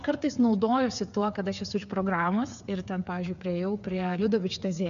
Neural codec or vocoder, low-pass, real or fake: codec, 16 kHz, 8 kbps, FreqCodec, smaller model; 7.2 kHz; fake